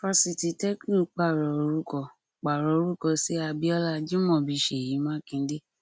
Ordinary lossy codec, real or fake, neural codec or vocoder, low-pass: none; real; none; none